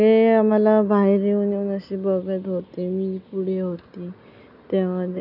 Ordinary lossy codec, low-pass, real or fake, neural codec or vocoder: none; 5.4 kHz; real; none